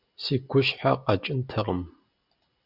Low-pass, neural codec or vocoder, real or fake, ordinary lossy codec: 5.4 kHz; none; real; Opus, 64 kbps